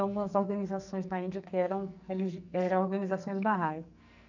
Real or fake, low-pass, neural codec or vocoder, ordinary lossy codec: fake; 7.2 kHz; codec, 44.1 kHz, 2.6 kbps, SNAC; none